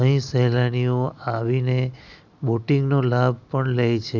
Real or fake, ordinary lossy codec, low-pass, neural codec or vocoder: real; none; 7.2 kHz; none